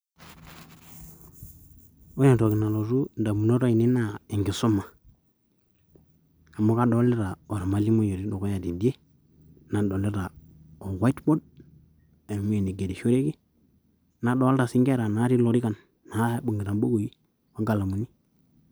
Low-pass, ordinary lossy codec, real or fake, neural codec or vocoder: none; none; real; none